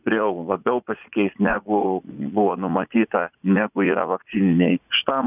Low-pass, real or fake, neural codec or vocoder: 3.6 kHz; fake; vocoder, 44.1 kHz, 80 mel bands, Vocos